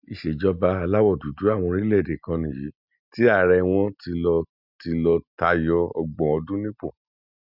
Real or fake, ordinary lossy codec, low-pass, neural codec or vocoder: real; none; 5.4 kHz; none